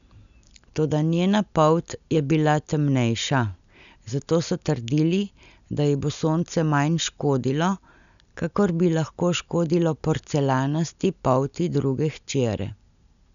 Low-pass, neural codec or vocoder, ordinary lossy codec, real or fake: 7.2 kHz; none; none; real